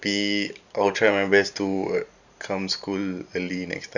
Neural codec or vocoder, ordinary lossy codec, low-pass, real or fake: vocoder, 44.1 kHz, 128 mel bands every 512 samples, BigVGAN v2; none; 7.2 kHz; fake